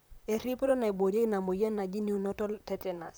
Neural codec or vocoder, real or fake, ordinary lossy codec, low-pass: vocoder, 44.1 kHz, 128 mel bands, Pupu-Vocoder; fake; none; none